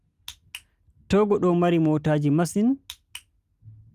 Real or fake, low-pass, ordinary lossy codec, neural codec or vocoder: real; 14.4 kHz; Opus, 32 kbps; none